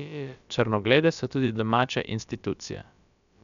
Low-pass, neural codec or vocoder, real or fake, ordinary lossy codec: 7.2 kHz; codec, 16 kHz, about 1 kbps, DyCAST, with the encoder's durations; fake; none